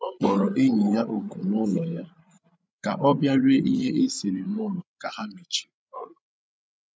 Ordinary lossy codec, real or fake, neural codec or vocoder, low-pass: none; fake; codec, 16 kHz, 8 kbps, FreqCodec, larger model; none